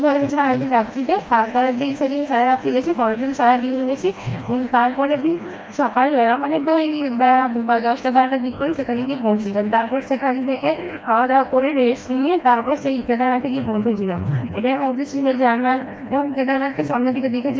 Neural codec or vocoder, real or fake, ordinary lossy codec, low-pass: codec, 16 kHz, 1 kbps, FreqCodec, smaller model; fake; none; none